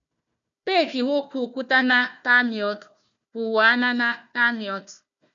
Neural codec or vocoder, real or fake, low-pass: codec, 16 kHz, 1 kbps, FunCodec, trained on Chinese and English, 50 frames a second; fake; 7.2 kHz